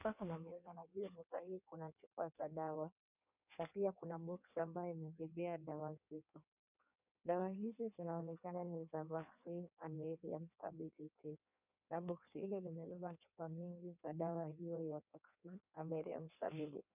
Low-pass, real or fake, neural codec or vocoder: 3.6 kHz; fake; codec, 16 kHz in and 24 kHz out, 1.1 kbps, FireRedTTS-2 codec